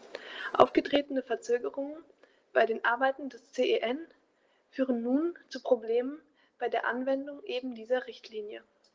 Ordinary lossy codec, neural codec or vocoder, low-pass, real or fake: Opus, 16 kbps; none; 7.2 kHz; real